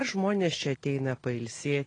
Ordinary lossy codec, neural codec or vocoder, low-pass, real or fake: AAC, 32 kbps; none; 9.9 kHz; real